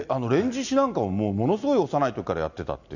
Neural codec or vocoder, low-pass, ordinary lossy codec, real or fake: none; 7.2 kHz; none; real